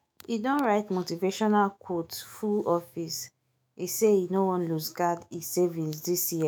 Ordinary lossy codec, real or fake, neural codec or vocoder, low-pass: none; fake; autoencoder, 48 kHz, 128 numbers a frame, DAC-VAE, trained on Japanese speech; none